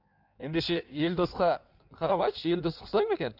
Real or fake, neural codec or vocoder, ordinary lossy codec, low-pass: fake; codec, 16 kHz in and 24 kHz out, 1.1 kbps, FireRedTTS-2 codec; none; 5.4 kHz